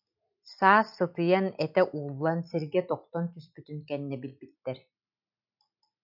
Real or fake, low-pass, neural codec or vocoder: real; 5.4 kHz; none